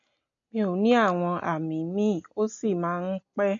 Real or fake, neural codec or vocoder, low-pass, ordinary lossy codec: real; none; 7.2 kHz; MP3, 48 kbps